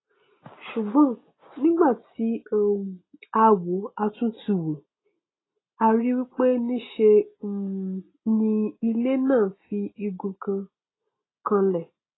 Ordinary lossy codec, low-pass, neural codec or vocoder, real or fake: AAC, 16 kbps; 7.2 kHz; none; real